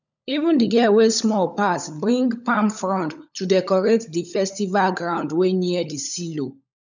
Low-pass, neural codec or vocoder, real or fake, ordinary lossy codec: 7.2 kHz; codec, 16 kHz, 16 kbps, FunCodec, trained on LibriTTS, 50 frames a second; fake; none